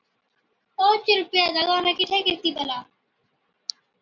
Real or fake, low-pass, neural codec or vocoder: real; 7.2 kHz; none